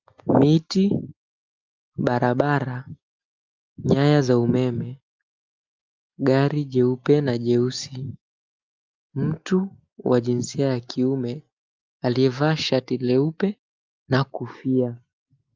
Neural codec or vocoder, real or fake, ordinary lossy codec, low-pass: none; real; Opus, 24 kbps; 7.2 kHz